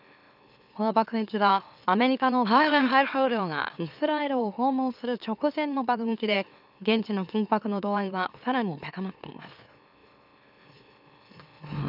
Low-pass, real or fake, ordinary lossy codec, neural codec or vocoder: 5.4 kHz; fake; none; autoencoder, 44.1 kHz, a latent of 192 numbers a frame, MeloTTS